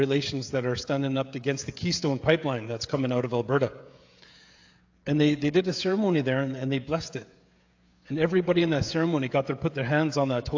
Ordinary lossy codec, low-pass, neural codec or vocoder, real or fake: AAC, 48 kbps; 7.2 kHz; codec, 16 kHz, 16 kbps, FreqCodec, smaller model; fake